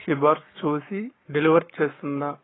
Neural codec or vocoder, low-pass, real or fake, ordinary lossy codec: codec, 44.1 kHz, 7.8 kbps, Pupu-Codec; 7.2 kHz; fake; AAC, 16 kbps